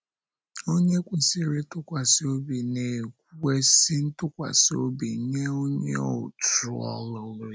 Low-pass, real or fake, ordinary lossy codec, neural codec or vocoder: none; real; none; none